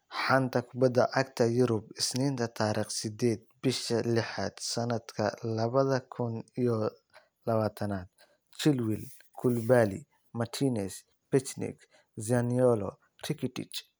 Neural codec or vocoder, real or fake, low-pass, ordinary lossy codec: none; real; none; none